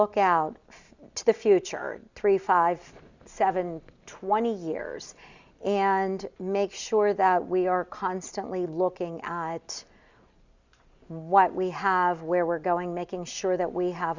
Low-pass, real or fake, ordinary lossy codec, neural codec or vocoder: 7.2 kHz; real; Opus, 64 kbps; none